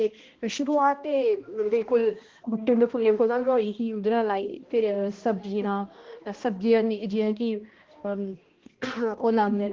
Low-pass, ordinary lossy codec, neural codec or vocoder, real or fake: 7.2 kHz; Opus, 16 kbps; codec, 16 kHz, 1 kbps, X-Codec, HuBERT features, trained on balanced general audio; fake